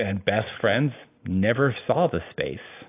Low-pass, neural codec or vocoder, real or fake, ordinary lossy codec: 3.6 kHz; none; real; AAC, 24 kbps